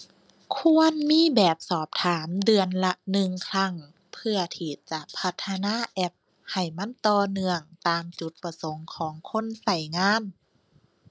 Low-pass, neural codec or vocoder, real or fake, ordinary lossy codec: none; none; real; none